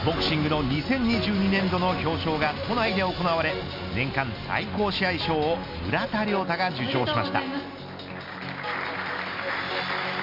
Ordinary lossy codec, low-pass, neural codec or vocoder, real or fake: MP3, 48 kbps; 5.4 kHz; none; real